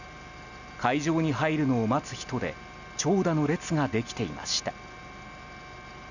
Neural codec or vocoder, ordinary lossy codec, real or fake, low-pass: none; none; real; 7.2 kHz